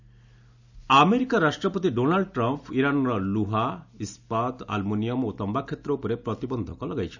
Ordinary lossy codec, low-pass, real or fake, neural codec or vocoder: none; 7.2 kHz; real; none